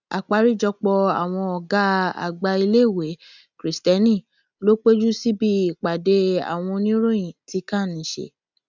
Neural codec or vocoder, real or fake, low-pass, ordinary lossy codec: none; real; 7.2 kHz; none